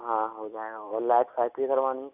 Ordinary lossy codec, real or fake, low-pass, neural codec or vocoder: none; real; 3.6 kHz; none